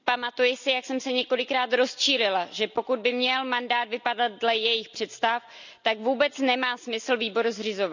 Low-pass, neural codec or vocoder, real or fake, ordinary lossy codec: 7.2 kHz; none; real; none